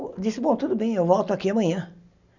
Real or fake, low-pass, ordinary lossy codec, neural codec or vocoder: real; 7.2 kHz; none; none